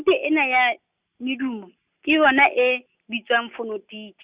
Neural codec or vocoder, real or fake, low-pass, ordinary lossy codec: none; real; 3.6 kHz; Opus, 64 kbps